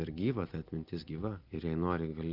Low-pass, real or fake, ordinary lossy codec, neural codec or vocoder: 5.4 kHz; real; Opus, 32 kbps; none